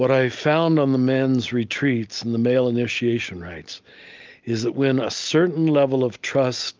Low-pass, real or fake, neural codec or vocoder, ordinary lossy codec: 7.2 kHz; real; none; Opus, 32 kbps